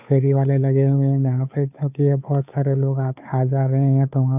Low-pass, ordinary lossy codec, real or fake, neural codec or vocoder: 3.6 kHz; none; fake; codec, 16 kHz, 2 kbps, FunCodec, trained on Chinese and English, 25 frames a second